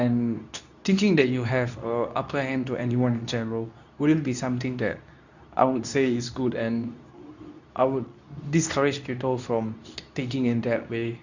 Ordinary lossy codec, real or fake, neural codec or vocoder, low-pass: none; fake; codec, 24 kHz, 0.9 kbps, WavTokenizer, medium speech release version 2; 7.2 kHz